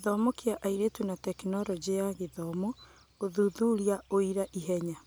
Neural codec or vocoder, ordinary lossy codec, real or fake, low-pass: none; none; real; none